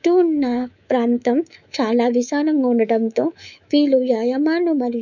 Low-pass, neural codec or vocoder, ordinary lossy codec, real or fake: 7.2 kHz; codec, 24 kHz, 3.1 kbps, DualCodec; none; fake